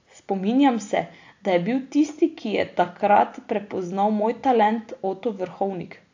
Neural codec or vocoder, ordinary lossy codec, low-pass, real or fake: none; AAC, 48 kbps; 7.2 kHz; real